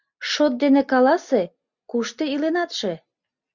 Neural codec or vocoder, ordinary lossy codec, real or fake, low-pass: none; Opus, 64 kbps; real; 7.2 kHz